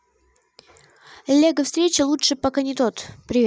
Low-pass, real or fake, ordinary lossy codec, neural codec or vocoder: none; real; none; none